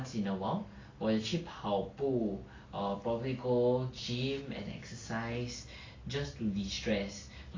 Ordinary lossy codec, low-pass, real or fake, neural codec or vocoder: AAC, 32 kbps; 7.2 kHz; real; none